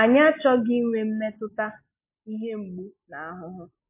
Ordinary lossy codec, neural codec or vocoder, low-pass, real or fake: MP3, 32 kbps; none; 3.6 kHz; real